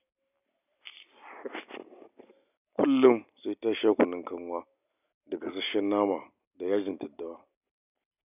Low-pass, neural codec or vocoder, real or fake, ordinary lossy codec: 3.6 kHz; none; real; none